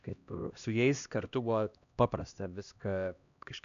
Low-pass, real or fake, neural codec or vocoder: 7.2 kHz; fake; codec, 16 kHz, 1 kbps, X-Codec, HuBERT features, trained on LibriSpeech